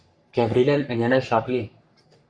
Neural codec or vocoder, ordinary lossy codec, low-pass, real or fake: codec, 44.1 kHz, 3.4 kbps, Pupu-Codec; Opus, 64 kbps; 9.9 kHz; fake